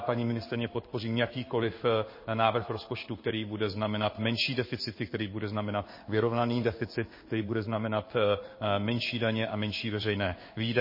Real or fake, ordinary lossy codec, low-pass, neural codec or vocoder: fake; MP3, 24 kbps; 5.4 kHz; codec, 16 kHz in and 24 kHz out, 1 kbps, XY-Tokenizer